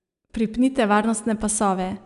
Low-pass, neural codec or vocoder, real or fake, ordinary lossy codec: 10.8 kHz; none; real; none